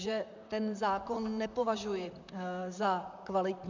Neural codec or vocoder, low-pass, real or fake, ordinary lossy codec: vocoder, 44.1 kHz, 128 mel bands, Pupu-Vocoder; 7.2 kHz; fake; MP3, 64 kbps